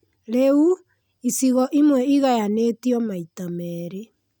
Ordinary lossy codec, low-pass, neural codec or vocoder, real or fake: none; none; none; real